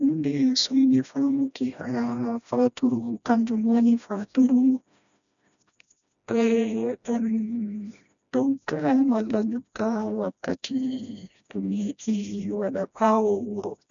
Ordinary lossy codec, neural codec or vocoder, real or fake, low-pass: none; codec, 16 kHz, 1 kbps, FreqCodec, smaller model; fake; 7.2 kHz